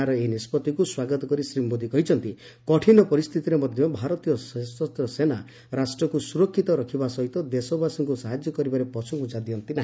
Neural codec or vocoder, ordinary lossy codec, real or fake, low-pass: none; none; real; none